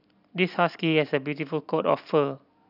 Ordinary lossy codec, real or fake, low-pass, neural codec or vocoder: none; real; 5.4 kHz; none